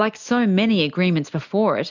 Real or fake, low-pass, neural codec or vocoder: real; 7.2 kHz; none